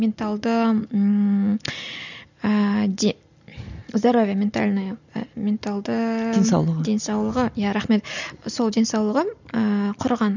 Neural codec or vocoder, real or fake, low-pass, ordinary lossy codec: none; real; 7.2 kHz; none